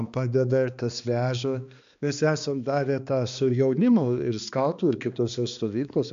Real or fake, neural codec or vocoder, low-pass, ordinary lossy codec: fake; codec, 16 kHz, 2 kbps, X-Codec, HuBERT features, trained on balanced general audio; 7.2 kHz; MP3, 64 kbps